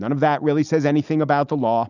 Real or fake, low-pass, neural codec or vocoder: real; 7.2 kHz; none